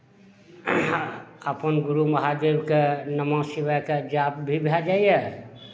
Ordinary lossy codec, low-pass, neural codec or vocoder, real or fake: none; none; none; real